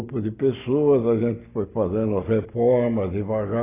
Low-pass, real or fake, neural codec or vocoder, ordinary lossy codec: 3.6 kHz; real; none; AAC, 16 kbps